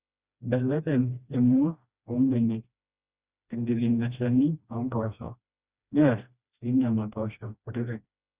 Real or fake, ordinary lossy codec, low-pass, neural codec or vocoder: fake; Opus, 64 kbps; 3.6 kHz; codec, 16 kHz, 1 kbps, FreqCodec, smaller model